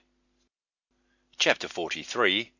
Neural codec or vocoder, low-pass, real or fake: none; 7.2 kHz; real